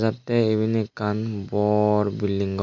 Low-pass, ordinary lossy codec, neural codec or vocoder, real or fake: 7.2 kHz; none; none; real